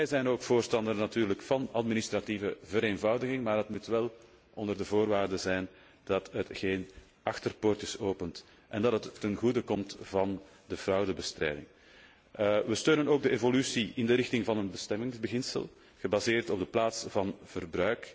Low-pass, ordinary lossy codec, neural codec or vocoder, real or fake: none; none; none; real